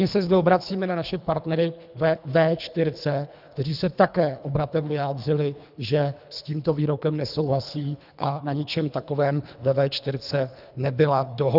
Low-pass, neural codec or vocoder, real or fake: 5.4 kHz; codec, 24 kHz, 3 kbps, HILCodec; fake